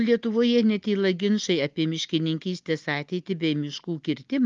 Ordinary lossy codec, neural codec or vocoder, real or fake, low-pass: Opus, 32 kbps; none; real; 7.2 kHz